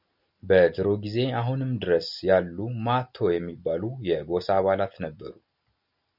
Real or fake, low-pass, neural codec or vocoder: real; 5.4 kHz; none